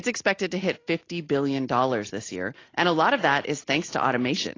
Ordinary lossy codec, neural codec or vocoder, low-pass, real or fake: AAC, 32 kbps; none; 7.2 kHz; real